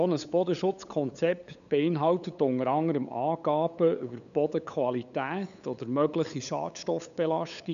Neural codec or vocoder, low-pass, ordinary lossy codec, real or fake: codec, 16 kHz, 8 kbps, FunCodec, trained on LibriTTS, 25 frames a second; 7.2 kHz; none; fake